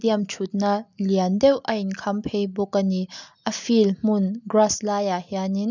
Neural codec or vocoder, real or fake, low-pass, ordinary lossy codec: none; real; 7.2 kHz; none